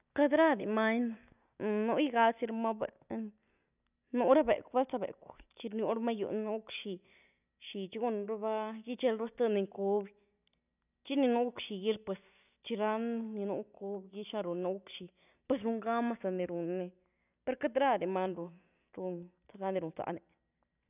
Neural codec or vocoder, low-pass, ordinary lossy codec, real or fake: none; 3.6 kHz; none; real